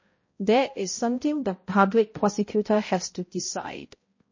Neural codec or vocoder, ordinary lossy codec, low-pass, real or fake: codec, 16 kHz, 0.5 kbps, X-Codec, HuBERT features, trained on balanced general audio; MP3, 32 kbps; 7.2 kHz; fake